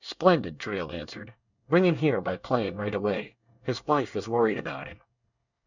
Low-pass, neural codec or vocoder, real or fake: 7.2 kHz; codec, 24 kHz, 1 kbps, SNAC; fake